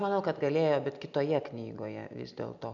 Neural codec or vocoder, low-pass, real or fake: none; 7.2 kHz; real